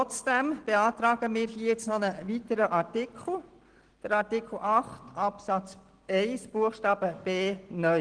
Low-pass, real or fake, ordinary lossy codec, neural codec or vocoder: 9.9 kHz; real; Opus, 16 kbps; none